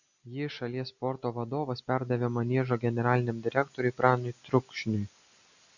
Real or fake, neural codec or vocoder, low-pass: real; none; 7.2 kHz